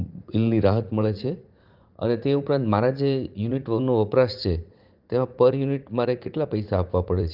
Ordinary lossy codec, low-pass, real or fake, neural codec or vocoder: Opus, 32 kbps; 5.4 kHz; fake; vocoder, 44.1 kHz, 80 mel bands, Vocos